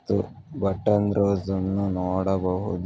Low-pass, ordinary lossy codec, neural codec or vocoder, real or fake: none; none; none; real